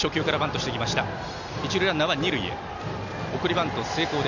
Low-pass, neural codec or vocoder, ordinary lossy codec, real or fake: 7.2 kHz; none; none; real